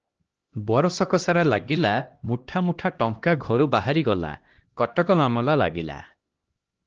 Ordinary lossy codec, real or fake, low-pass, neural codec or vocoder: Opus, 16 kbps; fake; 7.2 kHz; codec, 16 kHz, 1 kbps, X-Codec, HuBERT features, trained on LibriSpeech